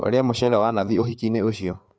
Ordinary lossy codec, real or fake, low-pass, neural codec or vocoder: none; fake; none; codec, 16 kHz, 4 kbps, FreqCodec, larger model